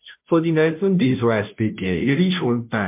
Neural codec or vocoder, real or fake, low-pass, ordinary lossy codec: codec, 16 kHz, 0.5 kbps, FunCodec, trained on Chinese and English, 25 frames a second; fake; 3.6 kHz; MP3, 32 kbps